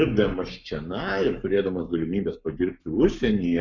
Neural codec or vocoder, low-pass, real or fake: codec, 44.1 kHz, 7.8 kbps, Pupu-Codec; 7.2 kHz; fake